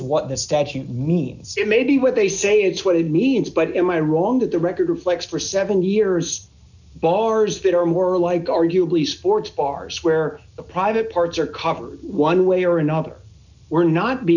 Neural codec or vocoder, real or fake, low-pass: none; real; 7.2 kHz